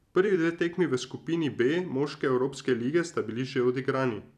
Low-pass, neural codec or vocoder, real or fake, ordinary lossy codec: 14.4 kHz; vocoder, 44.1 kHz, 128 mel bands every 256 samples, BigVGAN v2; fake; none